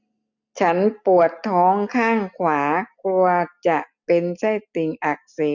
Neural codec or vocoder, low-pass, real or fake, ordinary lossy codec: none; 7.2 kHz; real; none